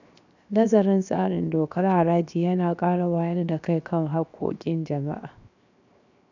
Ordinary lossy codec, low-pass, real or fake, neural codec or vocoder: none; 7.2 kHz; fake; codec, 16 kHz, 0.7 kbps, FocalCodec